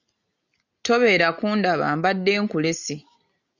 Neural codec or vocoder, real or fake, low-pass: none; real; 7.2 kHz